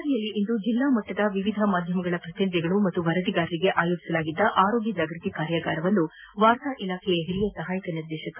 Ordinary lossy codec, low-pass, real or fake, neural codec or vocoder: none; 3.6 kHz; real; none